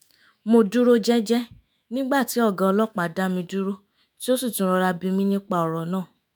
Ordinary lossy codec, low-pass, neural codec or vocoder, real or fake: none; none; autoencoder, 48 kHz, 128 numbers a frame, DAC-VAE, trained on Japanese speech; fake